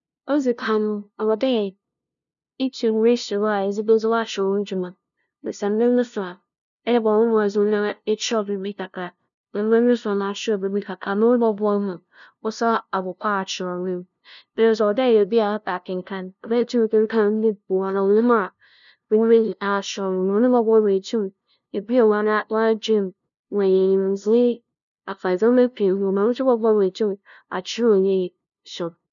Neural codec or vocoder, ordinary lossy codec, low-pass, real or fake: codec, 16 kHz, 0.5 kbps, FunCodec, trained on LibriTTS, 25 frames a second; none; 7.2 kHz; fake